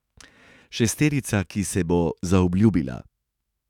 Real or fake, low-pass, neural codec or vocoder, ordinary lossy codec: fake; 19.8 kHz; autoencoder, 48 kHz, 128 numbers a frame, DAC-VAE, trained on Japanese speech; none